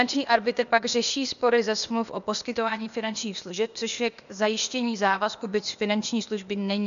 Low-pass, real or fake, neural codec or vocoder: 7.2 kHz; fake; codec, 16 kHz, 0.8 kbps, ZipCodec